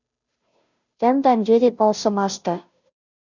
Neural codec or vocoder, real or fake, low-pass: codec, 16 kHz, 0.5 kbps, FunCodec, trained on Chinese and English, 25 frames a second; fake; 7.2 kHz